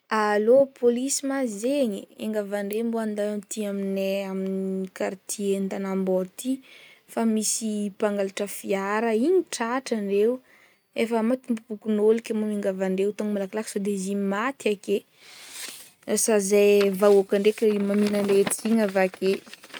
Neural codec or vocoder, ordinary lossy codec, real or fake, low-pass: none; none; real; none